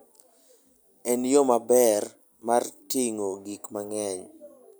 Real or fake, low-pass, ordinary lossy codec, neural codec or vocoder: real; none; none; none